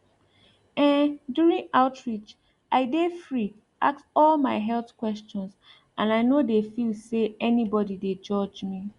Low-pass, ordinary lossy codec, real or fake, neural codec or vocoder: 10.8 kHz; Opus, 64 kbps; real; none